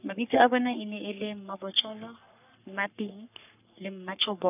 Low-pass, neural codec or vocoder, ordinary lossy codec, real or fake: 3.6 kHz; codec, 44.1 kHz, 3.4 kbps, Pupu-Codec; none; fake